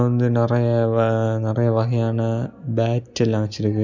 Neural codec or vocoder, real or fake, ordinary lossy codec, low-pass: none; real; none; 7.2 kHz